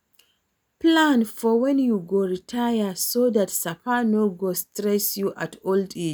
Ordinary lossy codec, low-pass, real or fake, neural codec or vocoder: none; none; real; none